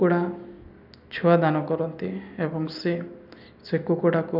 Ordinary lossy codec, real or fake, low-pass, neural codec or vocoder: none; real; 5.4 kHz; none